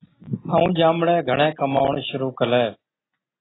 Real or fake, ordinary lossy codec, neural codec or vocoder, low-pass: real; AAC, 16 kbps; none; 7.2 kHz